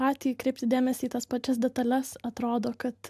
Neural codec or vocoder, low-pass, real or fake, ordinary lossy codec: none; 14.4 kHz; real; AAC, 96 kbps